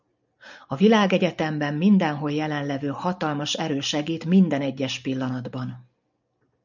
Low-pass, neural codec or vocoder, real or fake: 7.2 kHz; none; real